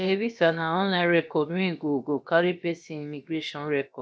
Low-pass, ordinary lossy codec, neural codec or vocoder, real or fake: none; none; codec, 16 kHz, about 1 kbps, DyCAST, with the encoder's durations; fake